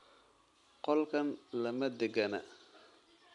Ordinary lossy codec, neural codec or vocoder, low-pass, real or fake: none; none; 10.8 kHz; real